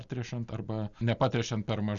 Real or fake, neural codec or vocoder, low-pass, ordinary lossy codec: real; none; 7.2 kHz; Opus, 64 kbps